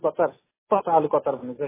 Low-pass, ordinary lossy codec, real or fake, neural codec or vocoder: 3.6 kHz; MP3, 16 kbps; real; none